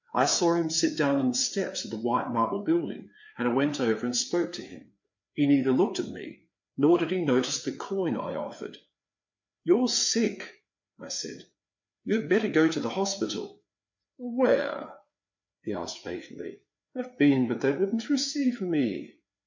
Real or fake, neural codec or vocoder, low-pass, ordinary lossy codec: fake; codec, 16 kHz, 4 kbps, FreqCodec, larger model; 7.2 kHz; MP3, 48 kbps